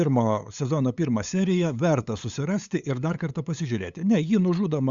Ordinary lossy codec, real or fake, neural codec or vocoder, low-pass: Opus, 64 kbps; fake; codec, 16 kHz, 8 kbps, FunCodec, trained on LibriTTS, 25 frames a second; 7.2 kHz